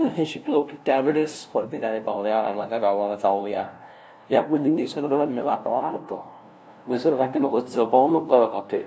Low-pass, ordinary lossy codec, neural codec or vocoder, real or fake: none; none; codec, 16 kHz, 0.5 kbps, FunCodec, trained on LibriTTS, 25 frames a second; fake